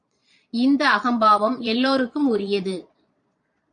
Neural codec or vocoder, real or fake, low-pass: vocoder, 22.05 kHz, 80 mel bands, Vocos; fake; 9.9 kHz